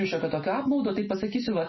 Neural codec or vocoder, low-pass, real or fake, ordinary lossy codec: none; 7.2 kHz; real; MP3, 24 kbps